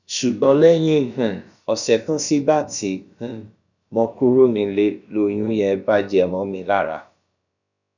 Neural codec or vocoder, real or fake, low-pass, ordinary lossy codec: codec, 16 kHz, about 1 kbps, DyCAST, with the encoder's durations; fake; 7.2 kHz; none